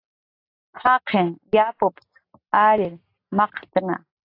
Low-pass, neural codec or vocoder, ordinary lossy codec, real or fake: 5.4 kHz; none; AAC, 32 kbps; real